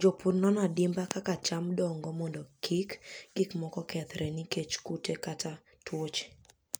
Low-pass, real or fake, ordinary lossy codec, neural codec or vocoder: none; real; none; none